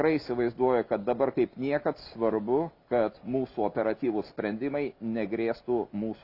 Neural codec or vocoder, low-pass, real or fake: none; 5.4 kHz; real